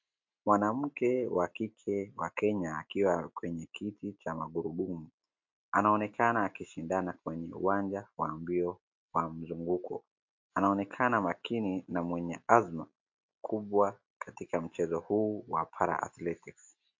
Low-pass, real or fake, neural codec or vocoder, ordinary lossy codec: 7.2 kHz; real; none; MP3, 48 kbps